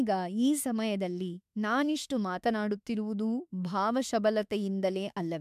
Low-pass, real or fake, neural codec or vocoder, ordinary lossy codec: 14.4 kHz; fake; autoencoder, 48 kHz, 32 numbers a frame, DAC-VAE, trained on Japanese speech; MP3, 96 kbps